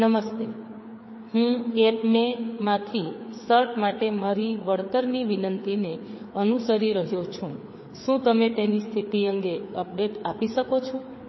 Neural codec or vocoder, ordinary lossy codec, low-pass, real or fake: codec, 16 kHz, 4 kbps, FreqCodec, larger model; MP3, 24 kbps; 7.2 kHz; fake